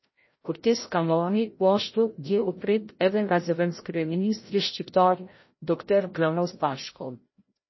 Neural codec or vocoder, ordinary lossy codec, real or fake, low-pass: codec, 16 kHz, 0.5 kbps, FreqCodec, larger model; MP3, 24 kbps; fake; 7.2 kHz